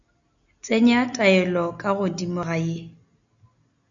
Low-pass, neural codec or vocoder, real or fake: 7.2 kHz; none; real